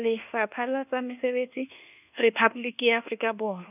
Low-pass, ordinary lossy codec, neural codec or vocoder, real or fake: 3.6 kHz; none; codec, 24 kHz, 1.2 kbps, DualCodec; fake